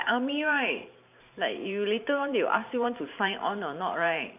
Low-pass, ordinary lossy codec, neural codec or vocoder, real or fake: 3.6 kHz; none; vocoder, 44.1 kHz, 128 mel bands every 512 samples, BigVGAN v2; fake